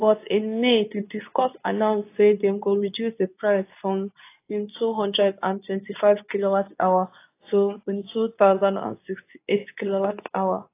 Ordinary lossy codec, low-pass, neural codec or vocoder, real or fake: AAC, 24 kbps; 3.6 kHz; codec, 24 kHz, 0.9 kbps, WavTokenizer, medium speech release version 2; fake